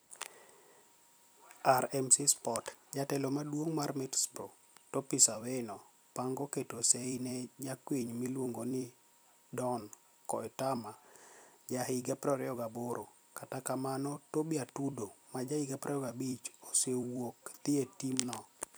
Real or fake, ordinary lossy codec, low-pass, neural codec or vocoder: fake; none; none; vocoder, 44.1 kHz, 128 mel bands every 256 samples, BigVGAN v2